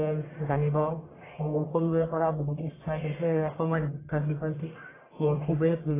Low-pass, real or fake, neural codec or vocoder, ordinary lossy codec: 3.6 kHz; fake; codec, 44.1 kHz, 1.7 kbps, Pupu-Codec; AAC, 16 kbps